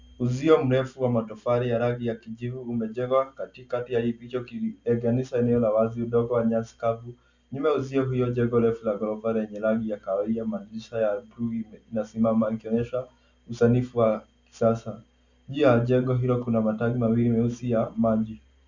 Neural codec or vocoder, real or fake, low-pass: none; real; 7.2 kHz